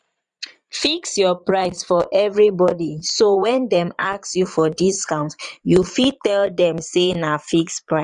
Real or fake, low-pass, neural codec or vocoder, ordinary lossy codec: fake; 9.9 kHz; vocoder, 22.05 kHz, 80 mel bands, Vocos; none